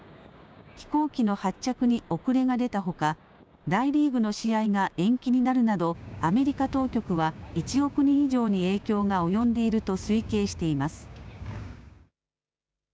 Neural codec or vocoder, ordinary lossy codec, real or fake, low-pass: codec, 16 kHz, 6 kbps, DAC; none; fake; none